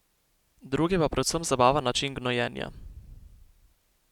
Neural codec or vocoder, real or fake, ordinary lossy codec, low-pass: none; real; none; 19.8 kHz